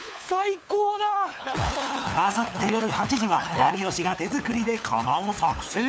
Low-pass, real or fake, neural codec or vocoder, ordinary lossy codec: none; fake; codec, 16 kHz, 4 kbps, FunCodec, trained on LibriTTS, 50 frames a second; none